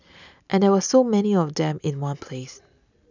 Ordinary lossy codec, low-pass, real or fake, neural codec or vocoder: none; 7.2 kHz; real; none